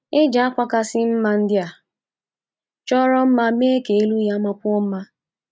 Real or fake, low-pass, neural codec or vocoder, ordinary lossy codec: real; none; none; none